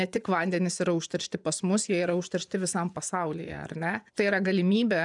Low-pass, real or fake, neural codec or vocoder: 10.8 kHz; fake; vocoder, 24 kHz, 100 mel bands, Vocos